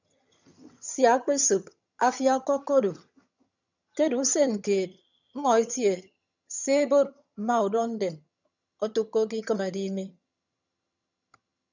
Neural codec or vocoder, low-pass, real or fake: vocoder, 22.05 kHz, 80 mel bands, HiFi-GAN; 7.2 kHz; fake